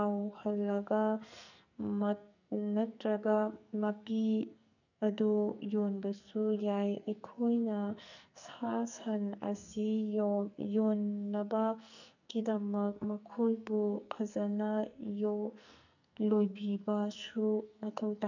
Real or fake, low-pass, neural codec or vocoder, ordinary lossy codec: fake; 7.2 kHz; codec, 44.1 kHz, 2.6 kbps, SNAC; none